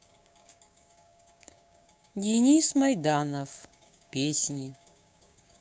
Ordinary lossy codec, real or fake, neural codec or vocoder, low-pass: none; fake; codec, 16 kHz, 6 kbps, DAC; none